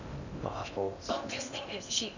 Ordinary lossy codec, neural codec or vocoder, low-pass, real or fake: none; codec, 16 kHz in and 24 kHz out, 0.6 kbps, FocalCodec, streaming, 2048 codes; 7.2 kHz; fake